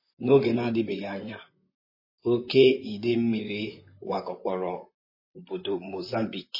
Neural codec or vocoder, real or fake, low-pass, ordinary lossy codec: vocoder, 44.1 kHz, 128 mel bands, Pupu-Vocoder; fake; 5.4 kHz; MP3, 24 kbps